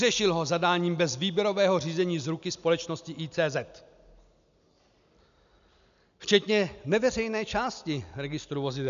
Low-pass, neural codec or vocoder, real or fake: 7.2 kHz; none; real